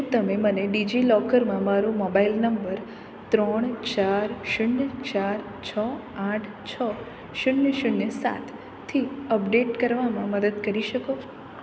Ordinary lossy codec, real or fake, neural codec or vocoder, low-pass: none; real; none; none